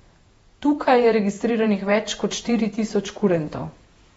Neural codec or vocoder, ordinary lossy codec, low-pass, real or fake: vocoder, 48 kHz, 128 mel bands, Vocos; AAC, 24 kbps; 19.8 kHz; fake